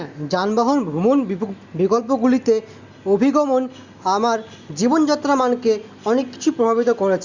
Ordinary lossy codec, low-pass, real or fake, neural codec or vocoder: Opus, 64 kbps; 7.2 kHz; real; none